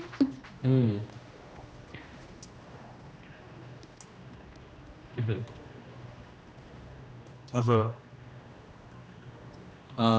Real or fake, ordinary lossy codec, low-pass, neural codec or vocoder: fake; none; none; codec, 16 kHz, 1 kbps, X-Codec, HuBERT features, trained on general audio